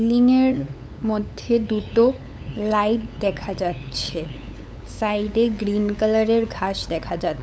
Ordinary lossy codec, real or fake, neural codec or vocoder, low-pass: none; fake; codec, 16 kHz, 8 kbps, FunCodec, trained on LibriTTS, 25 frames a second; none